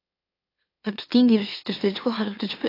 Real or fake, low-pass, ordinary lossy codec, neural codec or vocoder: fake; 5.4 kHz; AAC, 24 kbps; autoencoder, 44.1 kHz, a latent of 192 numbers a frame, MeloTTS